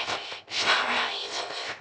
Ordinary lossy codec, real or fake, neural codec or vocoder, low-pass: none; fake; codec, 16 kHz, 0.3 kbps, FocalCodec; none